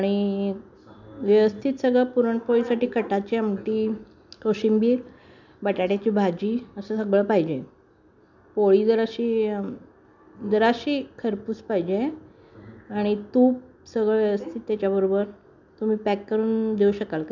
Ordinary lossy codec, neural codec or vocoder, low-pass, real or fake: none; none; 7.2 kHz; real